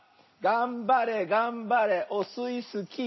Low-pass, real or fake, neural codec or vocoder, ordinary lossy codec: 7.2 kHz; real; none; MP3, 24 kbps